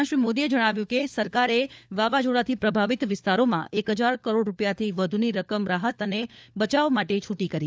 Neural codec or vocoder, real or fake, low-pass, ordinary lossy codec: codec, 16 kHz, 4 kbps, FunCodec, trained on LibriTTS, 50 frames a second; fake; none; none